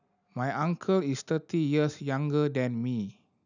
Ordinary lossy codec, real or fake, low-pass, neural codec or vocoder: none; real; 7.2 kHz; none